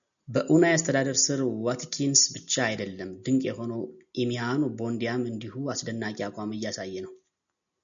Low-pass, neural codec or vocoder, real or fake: 7.2 kHz; none; real